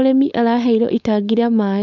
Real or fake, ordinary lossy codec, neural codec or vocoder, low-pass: fake; none; codec, 16 kHz, 4.8 kbps, FACodec; 7.2 kHz